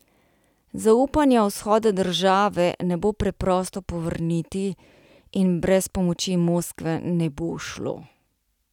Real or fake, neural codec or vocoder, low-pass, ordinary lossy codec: real; none; 19.8 kHz; none